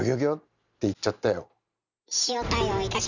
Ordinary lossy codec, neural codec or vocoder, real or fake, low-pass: none; none; real; 7.2 kHz